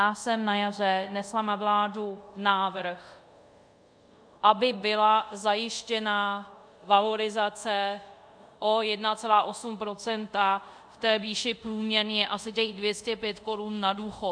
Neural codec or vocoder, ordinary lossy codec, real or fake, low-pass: codec, 24 kHz, 0.5 kbps, DualCodec; MP3, 64 kbps; fake; 9.9 kHz